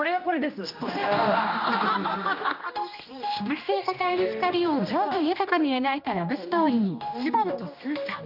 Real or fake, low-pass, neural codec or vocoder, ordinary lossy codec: fake; 5.4 kHz; codec, 16 kHz, 1 kbps, X-Codec, HuBERT features, trained on general audio; none